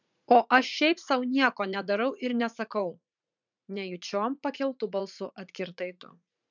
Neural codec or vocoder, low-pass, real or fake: vocoder, 44.1 kHz, 80 mel bands, Vocos; 7.2 kHz; fake